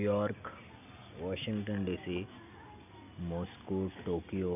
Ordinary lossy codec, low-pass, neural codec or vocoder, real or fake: none; 3.6 kHz; none; real